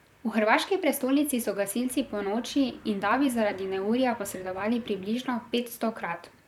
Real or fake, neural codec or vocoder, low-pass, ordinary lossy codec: fake; vocoder, 44.1 kHz, 128 mel bands, Pupu-Vocoder; 19.8 kHz; none